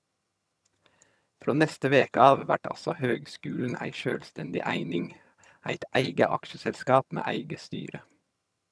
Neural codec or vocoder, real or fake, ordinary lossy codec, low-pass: vocoder, 22.05 kHz, 80 mel bands, HiFi-GAN; fake; none; none